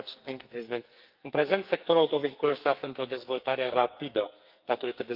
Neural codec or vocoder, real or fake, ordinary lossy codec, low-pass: codec, 44.1 kHz, 2.6 kbps, SNAC; fake; Opus, 32 kbps; 5.4 kHz